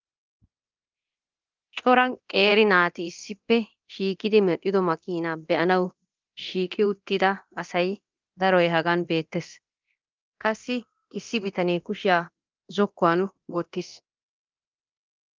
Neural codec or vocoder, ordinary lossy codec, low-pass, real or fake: codec, 24 kHz, 0.9 kbps, DualCodec; Opus, 24 kbps; 7.2 kHz; fake